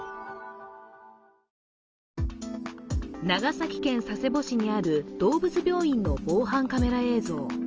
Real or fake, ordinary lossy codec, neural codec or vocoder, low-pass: real; Opus, 24 kbps; none; 7.2 kHz